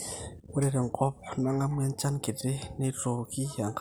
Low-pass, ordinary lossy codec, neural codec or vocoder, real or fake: none; none; none; real